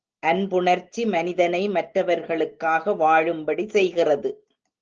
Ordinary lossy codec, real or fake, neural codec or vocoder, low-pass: Opus, 16 kbps; real; none; 7.2 kHz